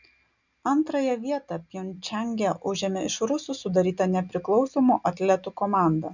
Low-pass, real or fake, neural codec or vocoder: 7.2 kHz; real; none